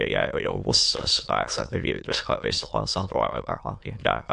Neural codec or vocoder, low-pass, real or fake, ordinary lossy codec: autoencoder, 22.05 kHz, a latent of 192 numbers a frame, VITS, trained on many speakers; 9.9 kHz; fake; MP3, 64 kbps